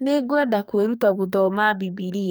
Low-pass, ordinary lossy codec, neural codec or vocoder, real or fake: none; none; codec, 44.1 kHz, 2.6 kbps, SNAC; fake